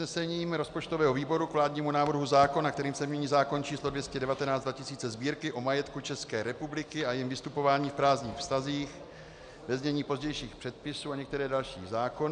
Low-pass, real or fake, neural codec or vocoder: 9.9 kHz; real; none